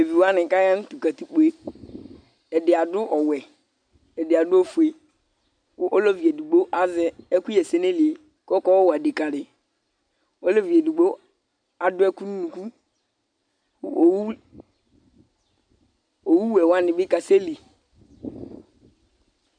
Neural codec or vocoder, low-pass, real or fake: none; 9.9 kHz; real